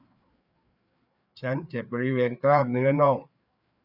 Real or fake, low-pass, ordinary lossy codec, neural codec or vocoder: fake; 5.4 kHz; none; codec, 16 kHz, 4 kbps, FreqCodec, larger model